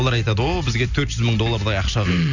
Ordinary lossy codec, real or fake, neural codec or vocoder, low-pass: none; real; none; 7.2 kHz